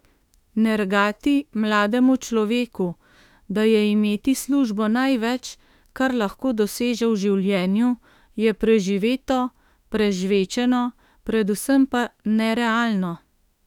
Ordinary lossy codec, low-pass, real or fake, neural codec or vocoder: none; 19.8 kHz; fake; autoencoder, 48 kHz, 32 numbers a frame, DAC-VAE, trained on Japanese speech